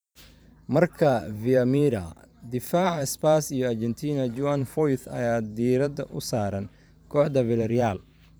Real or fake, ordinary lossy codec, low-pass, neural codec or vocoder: fake; none; none; vocoder, 44.1 kHz, 128 mel bands every 512 samples, BigVGAN v2